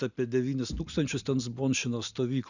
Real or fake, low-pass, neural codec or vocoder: real; 7.2 kHz; none